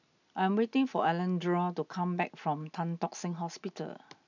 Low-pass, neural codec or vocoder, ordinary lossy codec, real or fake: 7.2 kHz; none; none; real